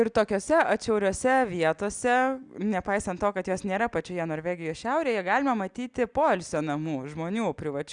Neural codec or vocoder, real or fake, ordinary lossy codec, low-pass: none; real; Opus, 64 kbps; 9.9 kHz